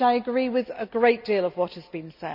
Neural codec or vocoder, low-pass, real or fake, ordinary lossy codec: none; 5.4 kHz; real; none